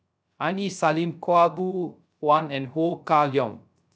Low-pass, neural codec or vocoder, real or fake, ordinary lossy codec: none; codec, 16 kHz, 0.3 kbps, FocalCodec; fake; none